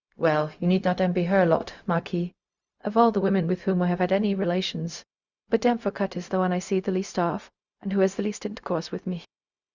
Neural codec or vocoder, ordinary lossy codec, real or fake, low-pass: codec, 16 kHz, 0.4 kbps, LongCat-Audio-Codec; Opus, 64 kbps; fake; 7.2 kHz